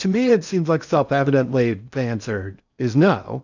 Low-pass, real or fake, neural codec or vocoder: 7.2 kHz; fake; codec, 16 kHz in and 24 kHz out, 0.6 kbps, FocalCodec, streaming, 2048 codes